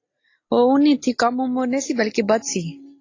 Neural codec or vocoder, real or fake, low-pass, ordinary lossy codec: none; real; 7.2 kHz; AAC, 32 kbps